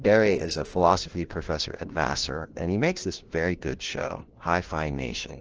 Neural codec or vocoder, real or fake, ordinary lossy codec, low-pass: codec, 16 kHz, 1 kbps, FunCodec, trained on LibriTTS, 50 frames a second; fake; Opus, 16 kbps; 7.2 kHz